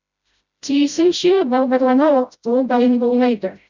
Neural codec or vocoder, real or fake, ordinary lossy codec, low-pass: codec, 16 kHz, 0.5 kbps, FreqCodec, smaller model; fake; none; 7.2 kHz